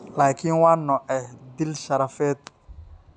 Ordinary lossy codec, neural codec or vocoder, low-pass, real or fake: none; none; 9.9 kHz; real